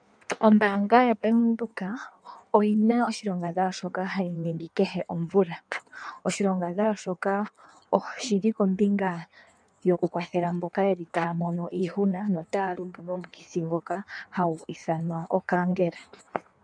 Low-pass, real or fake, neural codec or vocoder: 9.9 kHz; fake; codec, 16 kHz in and 24 kHz out, 1.1 kbps, FireRedTTS-2 codec